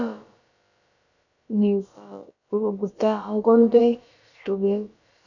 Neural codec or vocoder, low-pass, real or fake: codec, 16 kHz, about 1 kbps, DyCAST, with the encoder's durations; 7.2 kHz; fake